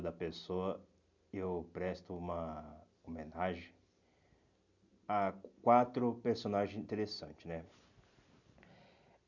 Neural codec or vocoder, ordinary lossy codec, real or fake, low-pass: none; none; real; 7.2 kHz